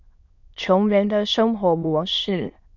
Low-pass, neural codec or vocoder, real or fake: 7.2 kHz; autoencoder, 22.05 kHz, a latent of 192 numbers a frame, VITS, trained on many speakers; fake